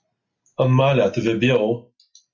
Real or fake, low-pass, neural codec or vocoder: real; 7.2 kHz; none